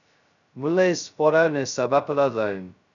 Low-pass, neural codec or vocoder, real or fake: 7.2 kHz; codec, 16 kHz, 0.2 kbps, FocalCodec; fake